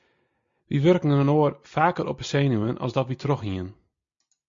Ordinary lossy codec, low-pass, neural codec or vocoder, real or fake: MP3, 48 kbps; 7.2 kHz; none; real